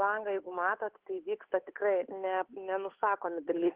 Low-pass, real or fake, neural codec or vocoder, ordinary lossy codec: 3.6 kHz; fake; codec, 16 kHz, 8 kbps, FunCodec, trained on Chinese and English, 25 frames a second; Opus, 16 kbps